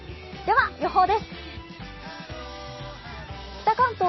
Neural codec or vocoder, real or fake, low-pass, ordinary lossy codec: none; real; 7.2 kHz; MP3, 24 kbps